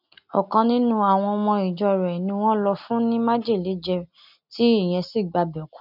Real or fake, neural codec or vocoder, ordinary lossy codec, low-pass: real; none; none; 5.4 kHz